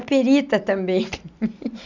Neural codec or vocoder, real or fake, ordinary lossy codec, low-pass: none; real; none; 7.2 kHz